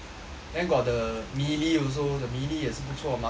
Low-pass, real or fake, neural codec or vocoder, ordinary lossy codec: none; real; none; none